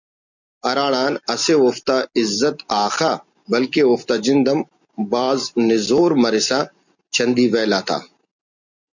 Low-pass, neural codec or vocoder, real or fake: 7.2 kHz; none; real